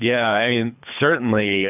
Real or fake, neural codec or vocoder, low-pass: fake; codec, 24 kHz, 3 kbps, HILCodec; 3.6 kHz